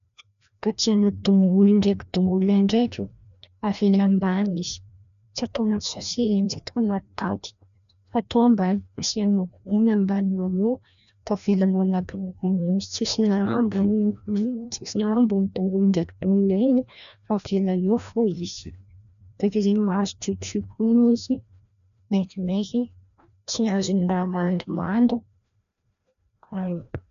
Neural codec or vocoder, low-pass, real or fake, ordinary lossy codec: codec, 16 kHz, 1 kbps, FreqCodec, larger model; 7.2 kHz; fake; none